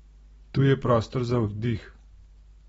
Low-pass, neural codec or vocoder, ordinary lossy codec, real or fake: 19.8 kHz; none; AAC, 24 kbps; real